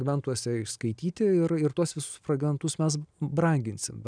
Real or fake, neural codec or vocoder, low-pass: real; none; 9.9 kHz